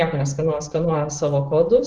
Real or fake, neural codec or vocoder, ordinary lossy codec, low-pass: real; none; Opus, 16 kbps; 10.8 kHz